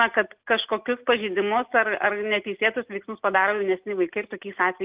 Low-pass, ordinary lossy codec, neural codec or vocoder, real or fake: 3.6 kHz; Opus, 24 kbps; none; real